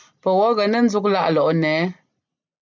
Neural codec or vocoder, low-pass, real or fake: none; 7.2 kHz; real